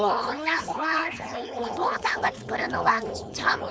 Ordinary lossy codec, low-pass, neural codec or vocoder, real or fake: none; none; codec, 16 kHz, 4.8 kbps, FACodec; fake